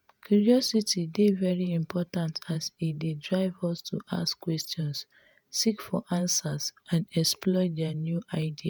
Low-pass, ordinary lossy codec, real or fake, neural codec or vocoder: none; none; fake; vocoder, 48 kHz, 128 mel bands, Vocos